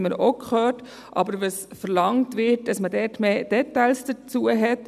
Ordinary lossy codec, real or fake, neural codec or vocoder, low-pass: none; real; none; 14.4 kHz